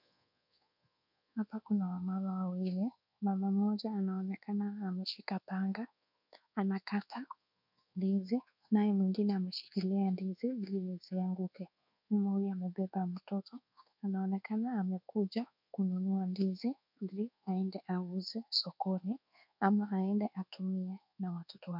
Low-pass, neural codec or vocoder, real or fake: 5.4 kHz; codec, 24 kHz, 1.2 kbps, DualCodec; fake